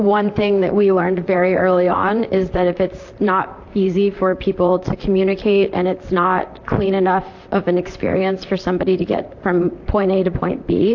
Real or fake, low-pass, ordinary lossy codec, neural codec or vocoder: fake; 7.2 kHz; AAC, 48 kbps; vocoder, 44.1 kHz, 128 mel bands, Pupu-Vocoder